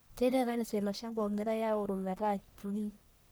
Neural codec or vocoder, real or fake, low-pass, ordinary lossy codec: codec, 44.1 kHz, 1.7 kbps, Pupu-Codec; fake; none; none